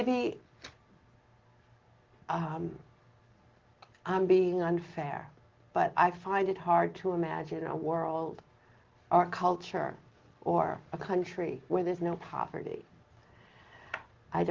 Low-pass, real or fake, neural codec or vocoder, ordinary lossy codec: 7.2 kHz; real; none; Opus, 16 kbps